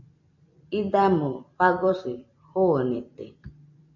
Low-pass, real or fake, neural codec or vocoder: 7.2 kHz; fake; vocoder, 44.1 kHz, 128 mel bands every 256 samples, BigVGAN v2